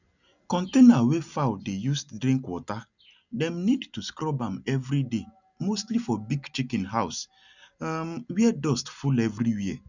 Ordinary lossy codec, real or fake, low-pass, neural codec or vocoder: none; real; 7.2 kHz; none